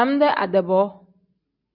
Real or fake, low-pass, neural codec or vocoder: real; 5.4 kHz; none